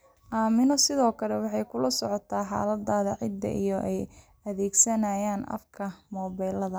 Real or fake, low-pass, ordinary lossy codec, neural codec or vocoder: real; none; none; none